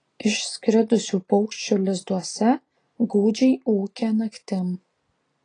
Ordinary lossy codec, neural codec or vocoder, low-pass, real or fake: AAC, 32 kbps; none; 9.9 kHz; real